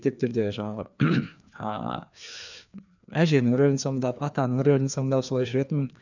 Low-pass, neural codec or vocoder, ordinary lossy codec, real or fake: 7.2 kHz; codec, 16 kHz, 2 kbps, FreqCodec, larger model; none; fake